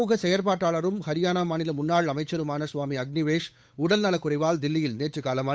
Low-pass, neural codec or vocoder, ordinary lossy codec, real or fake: none; codec, 16 kHz, 8 kbps, FunCodec, trained on Chinese and English, 25 frames a second; none; fake